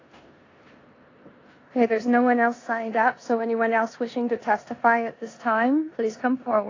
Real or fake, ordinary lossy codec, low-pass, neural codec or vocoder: fake; AAC, 32 kbps; 7.2 kHz; codec, 16 kHz in and 24 kHz out, 0.9 kbps, LongCat-Audio-Codec, four codebook decoder